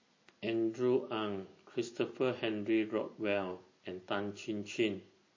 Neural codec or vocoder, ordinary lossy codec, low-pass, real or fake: none; MP3, 32 kbps; 7.2 kHz; real